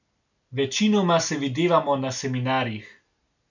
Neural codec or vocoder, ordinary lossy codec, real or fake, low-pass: none; none; real; 7.2 kHz